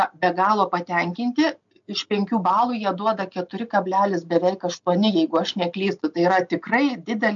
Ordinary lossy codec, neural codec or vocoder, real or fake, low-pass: MP3, 64 kbps; none; real; 7.2 kHz